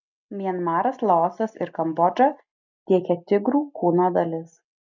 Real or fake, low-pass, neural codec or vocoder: real; 7.2 kHz; none